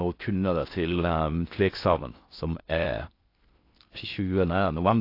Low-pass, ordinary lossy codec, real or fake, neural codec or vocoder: 5.4 kHz; AAC, 32 kbps; fake; codec, 16 kHz in and 24 kHz out, 0.6 kbps, FocalCodec, streaming, 4096 codes